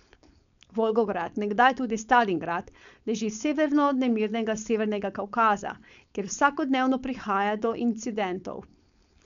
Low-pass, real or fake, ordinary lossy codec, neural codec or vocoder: 7.2 kHz; fake; none; codec, 16 kHz, 4.8 kbps, FACodec